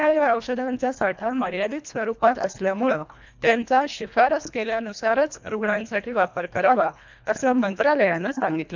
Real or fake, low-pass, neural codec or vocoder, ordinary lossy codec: fake; 7.2 kHz; codec, 24 kHz, 1.5 kbps, HILCodec; MP3, 64 kbps